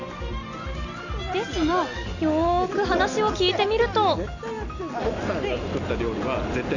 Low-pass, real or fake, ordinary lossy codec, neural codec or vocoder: 7.2 kHz; real; none; none